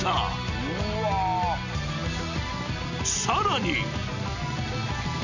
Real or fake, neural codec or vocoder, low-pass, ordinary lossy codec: real; none; 7.2 kHz; none